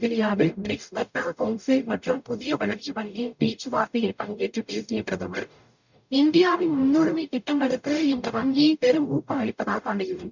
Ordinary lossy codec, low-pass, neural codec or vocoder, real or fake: none; 7.2 kHz; codec, 44.1 kHz, 0.9 kbps, DAC; fake